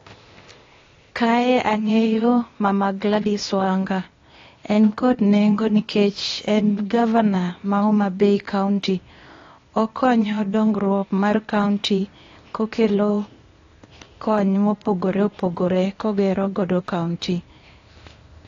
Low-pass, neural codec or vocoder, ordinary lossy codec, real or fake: 7.2 kHz; codec, 16 kHz, 0.8 kbps, ZipCodec; AAC, 32 kbps; fake